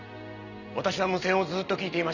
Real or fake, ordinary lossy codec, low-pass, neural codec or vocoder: real; none; 7.2 kHz; none